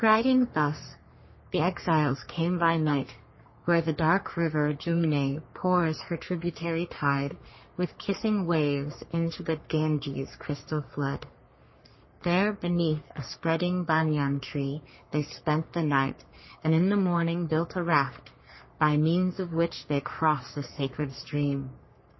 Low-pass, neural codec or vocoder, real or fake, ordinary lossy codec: 7.2 kHz; codec, 44.1 kHz, 3.4 kbps, Pupu-Codec; fake; MP3, 24 kbps